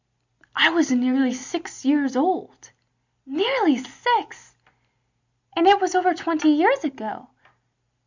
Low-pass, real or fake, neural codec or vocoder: 7.2 kHz; real; none